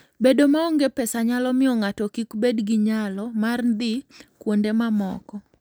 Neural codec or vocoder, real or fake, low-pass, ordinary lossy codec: none; real; none; none